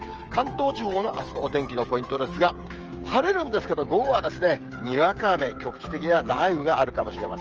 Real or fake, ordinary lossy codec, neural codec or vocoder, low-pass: fake; Opus, 24 kbps; codec, 16 kHz, 8 kbps, FreqCodec, smaller model; 7.2 kHz